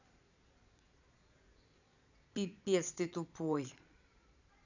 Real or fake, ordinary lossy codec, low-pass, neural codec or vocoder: fake; none; 7.2 kHz; vocoder, 22.05 kHz, 80 mel bands, Vocos